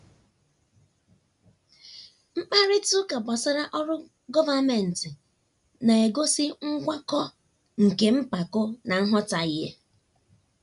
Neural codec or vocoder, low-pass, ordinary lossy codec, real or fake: none; 10.8 kHz; none; real